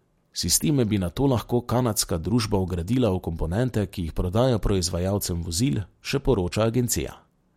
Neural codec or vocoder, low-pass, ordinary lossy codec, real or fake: none; 19.8 kHz; MP3, 64 kbps; real